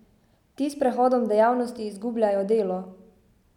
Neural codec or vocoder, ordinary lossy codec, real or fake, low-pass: none; none; real; 19.8 kHz